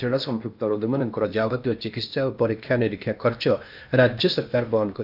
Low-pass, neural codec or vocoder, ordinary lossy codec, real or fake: 5.4 kHz; codec, 16 kHz in and 24 kHz out, 0.8 kbps, FocalCodec, streaming, 65536 codes; AAC, 48 kbps; fake